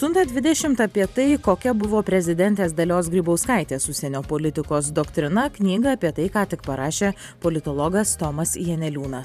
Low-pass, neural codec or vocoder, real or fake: 14.4 kHz; vocoder, 44.1 kHz, 128 mel bands every 512 samples, BigVGAN v2; fake